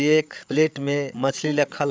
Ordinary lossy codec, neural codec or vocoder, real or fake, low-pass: none; codec, 16 kHz, 8 kbps, FreqCodec, larger model; fake; none